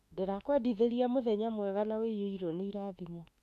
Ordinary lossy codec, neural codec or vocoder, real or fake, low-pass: none; autoencoder, 48 kHz, 32 numbers a frame, DAC-VAE, trained on Japanese speech; fake; 14.4 kHz